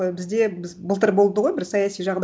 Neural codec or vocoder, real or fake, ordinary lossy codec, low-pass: none; real; none; none